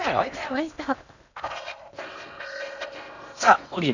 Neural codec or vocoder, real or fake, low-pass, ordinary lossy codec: codec, 16 kHz in and 24 kHz out, 0.8 kbps, FocalCodec, streaming, 65536 codes; fake; 7.2 kHz; none